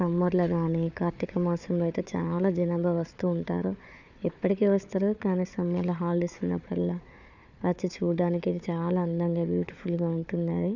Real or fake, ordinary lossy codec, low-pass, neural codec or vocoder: fake; none; 7.2 kHz; codec, 16 kHz, 4 kbps, FunCodec, trained on Chinese and English, 50 frames a second